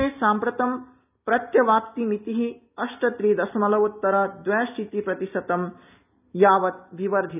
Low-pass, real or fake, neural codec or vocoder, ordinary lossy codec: 3.6 kHz; real; none; none